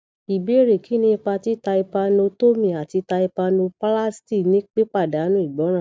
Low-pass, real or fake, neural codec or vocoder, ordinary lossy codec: none; real; none; none